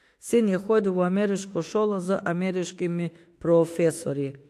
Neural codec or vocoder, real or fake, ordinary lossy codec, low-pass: autoencoder, 48 kHz, 32 numbers a frame, DAC-VAE, trained on Japanese speech; fake; AAC, 64 kbps; 14.4 kHz